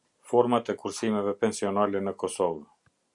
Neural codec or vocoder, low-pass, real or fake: none; 10.8 kHz; real